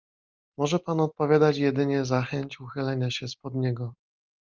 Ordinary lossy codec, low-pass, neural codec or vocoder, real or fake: Opus, 24 kbps; 7.2 kHz; none; real